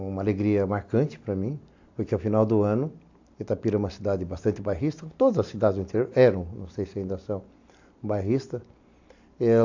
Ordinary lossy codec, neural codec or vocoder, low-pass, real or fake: AAC, 48 kbps; none; 7.2 kHz; real